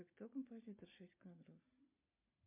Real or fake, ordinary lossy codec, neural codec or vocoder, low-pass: real; MP3, 32 kbps; none; 3.6 kHz